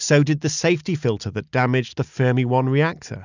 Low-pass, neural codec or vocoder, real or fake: 7.2 kHz; none; real